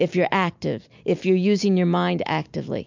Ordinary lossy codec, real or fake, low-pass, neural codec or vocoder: MP3, 64 kbps; real; 7.2 kHz; none